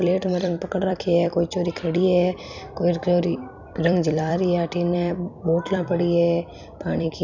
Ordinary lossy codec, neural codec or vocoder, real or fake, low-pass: none; vocoder, 44.1 kHz, 128 mel bands every 256 samples, BigVGAN v2; fake; 7.2 kHz